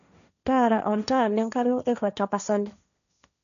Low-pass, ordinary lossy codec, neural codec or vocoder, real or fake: 7.2 kHz; none; codec, 16 kHz, 1.1 kbps, Voila-Tokenizer; fake